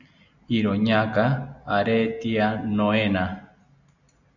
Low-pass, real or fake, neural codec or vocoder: 7.2 kHz; real; none